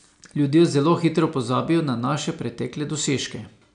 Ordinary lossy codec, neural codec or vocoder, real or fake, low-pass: none; none; real; 9.9 kHz